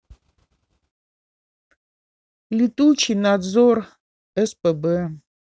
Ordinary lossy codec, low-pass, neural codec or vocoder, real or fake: none; none; none; real